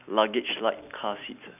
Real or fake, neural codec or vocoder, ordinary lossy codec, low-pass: real; none; none; 3.6 kHz